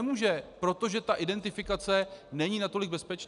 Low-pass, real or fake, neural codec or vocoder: 10.8 kHz; real; none